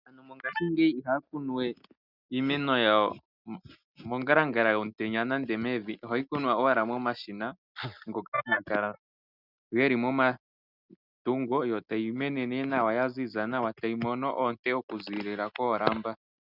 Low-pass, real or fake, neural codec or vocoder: 5.4 kHz; real; none